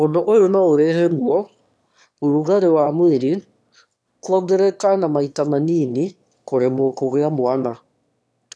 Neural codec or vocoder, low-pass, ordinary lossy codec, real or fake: autoencoder, 22.05 kHz, a latent of 192 numbers a frame, VITS, trained on one speaker; none; none; fake